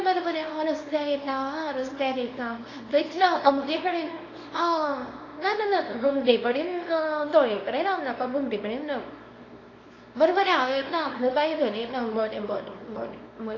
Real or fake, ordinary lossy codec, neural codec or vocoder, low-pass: fake; AAC, 32 kbps; codec, 24 kHz, 0.9 kbps, WavTokenizer, small release; 7.2 kHz